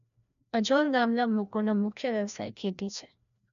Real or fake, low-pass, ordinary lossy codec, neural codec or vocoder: fake; 7.2 kHz; none; codec, 16 kHz, 1 kbps, FreqCodec, larger model